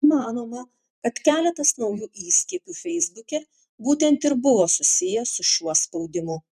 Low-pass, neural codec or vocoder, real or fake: 14.4 kHz; vocoder, 44.1 kHz, 128 mel bands every 512 samples, BigVGAN v2; fake